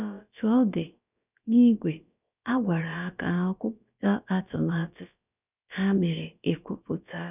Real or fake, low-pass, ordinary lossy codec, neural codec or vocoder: fake; 3.6 kHz; none; codec, 16 kHz, about 1 kbps, DyCAST, with the encoder's durations